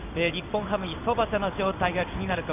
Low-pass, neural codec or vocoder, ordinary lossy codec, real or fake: 3.6 kHz; codec, 16 kHz in and 24 kHz out, 1 kbps, XY-Tokenizer; none; fake